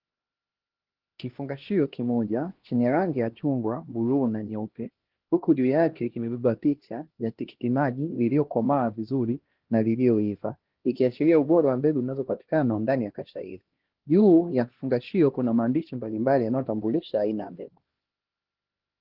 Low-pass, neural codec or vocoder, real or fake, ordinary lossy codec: 5.4 kHz; codec, 16 kHz, 1 kbps, X-Codec, HuBERT features, trained on LibriSpeech; fake; Opus, 16 kbps